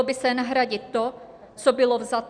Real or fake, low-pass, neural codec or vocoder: real; 9.9 kHz; none